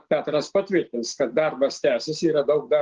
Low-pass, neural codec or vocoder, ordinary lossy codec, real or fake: 7.2 kHz; none; Opus, 16 kbps; real